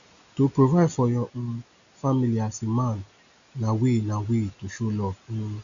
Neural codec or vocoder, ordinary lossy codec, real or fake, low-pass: none; none; real; 7.2 kHz